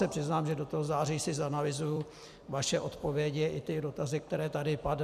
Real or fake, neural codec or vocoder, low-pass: fake; vocoder, 44.1 kHz, 128 mel bands every 256 samples, BigVGAN v2; 14.4 kHz